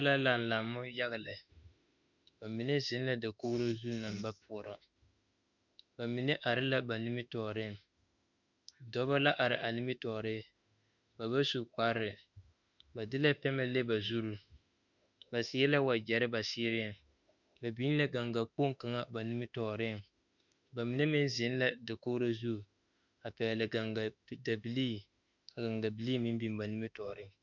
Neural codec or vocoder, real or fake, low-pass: autoencoder, 48 kHz, 32 numbers a frame, DAC-VAE, trained on Japanese speech; fake; 7.2 kHz